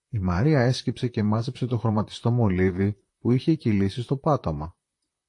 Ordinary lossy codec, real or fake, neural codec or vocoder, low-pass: AAC, 48 kbps; fake; vocoder, 44.1 kHz, 128 mel bands, Pupu-Vocoder; 10.8 kHz